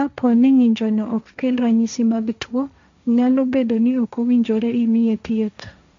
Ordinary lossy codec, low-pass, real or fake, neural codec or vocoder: MP3, 64 kbps; 7.2 kHz; fake; codec, 16 kHz, 1.1 kbps, Voila-Tokenizer